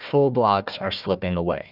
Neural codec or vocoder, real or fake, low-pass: codec, 16 kHz, 1 kbps, FunCodec, trained on Chinese and English, 50 frames a second; fake; 5.4 kHz